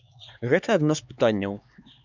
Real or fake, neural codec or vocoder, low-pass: fake; codec, 16 kHz, 4 kbps, X-Codec, HuBERT features, trained on LibriSpeech; 7.2 kHz